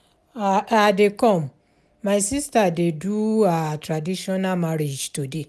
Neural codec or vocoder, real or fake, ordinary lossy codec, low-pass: none; real; none; none